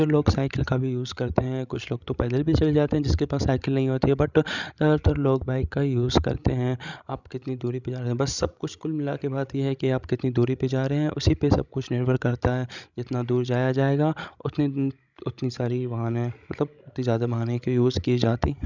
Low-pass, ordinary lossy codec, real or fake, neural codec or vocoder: 7.2 kHz; none; fake; codec, 16 kHz, 16 kbps, FreqCodec, larger model